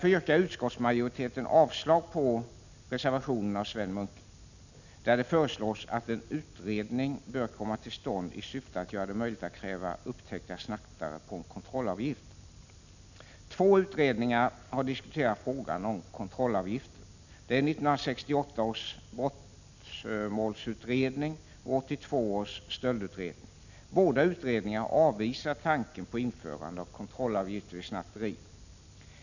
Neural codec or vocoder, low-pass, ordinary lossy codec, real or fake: none; 7.2 kHz; none; real